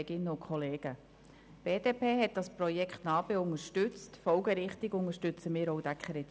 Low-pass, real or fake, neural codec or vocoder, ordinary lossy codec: none; real; none; none